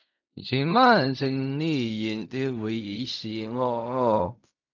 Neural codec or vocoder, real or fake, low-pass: codec, 16 kHz in and 24 kHz out, 0.4 kbps, LongCat-Audio-Codec, fine tuned four codebook decoder; fake; 7.2 kHz